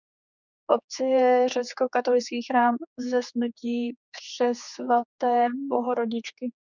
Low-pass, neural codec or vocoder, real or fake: 7.2 kHz; codec, 16 kHz, 4 kbps, X-Codec, HuBERT features, trained on general audio; fake